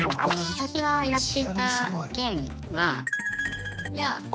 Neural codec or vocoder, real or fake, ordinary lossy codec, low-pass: codec, 16 kHz, 2 kbps, X-Codec, HuBERT features, trained on general audio; fake; none; none